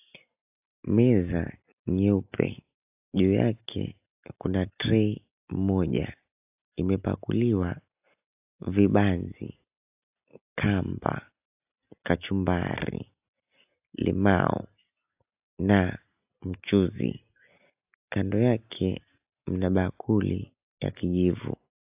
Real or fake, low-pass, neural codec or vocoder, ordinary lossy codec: real; 3.6 kHz; none; AAC, 32 kbps